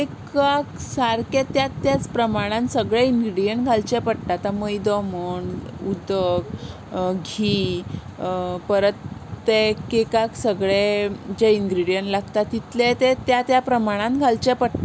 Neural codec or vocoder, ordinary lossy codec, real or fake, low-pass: none; none; real; none